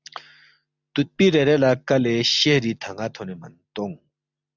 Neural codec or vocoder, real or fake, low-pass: none; real; 7.2 kHz